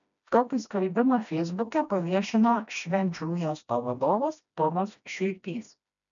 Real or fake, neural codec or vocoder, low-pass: fake; codec, 16 kHz, 1 kbps, FreqCodec, smaller model; 7.2 kHz